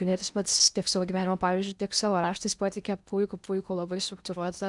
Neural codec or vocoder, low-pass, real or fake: codec, 16 kHz in and 24 kHz out, 0.8 kbps, FocalCodec, streaming, 65536 codes; 10.8 kHz; fake